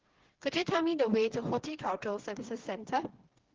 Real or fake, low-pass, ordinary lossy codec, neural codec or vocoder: fake; 7.2 kHz; Opus, 16 kbps; codec, 16 kHz, 4 kbps, FreqCodec, smaller model